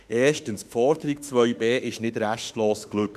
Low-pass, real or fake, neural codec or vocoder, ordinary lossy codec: 14.4 kHz; fake; autoencoder, 48 kHz, 32 numbers a frame, DAC-VAE, trained on Japanese speech; none